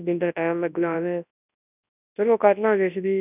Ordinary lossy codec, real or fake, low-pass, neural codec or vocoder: none; fake; 3.6 kHz; codec, 24 kHz, 0.9 kbps, WavTokenizer, large speech release